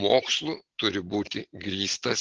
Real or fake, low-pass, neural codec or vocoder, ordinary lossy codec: real; 7.2 kHz; none; Opus, 16 kbps